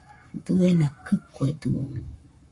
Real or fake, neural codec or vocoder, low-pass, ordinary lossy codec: fake; codec, 44.1 kHz, 7.8 kbps, Pupu-Codec; 10.8 kHz; MP3, 48 kbps